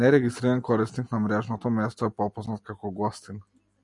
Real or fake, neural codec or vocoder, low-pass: real; none; 10.8 kHz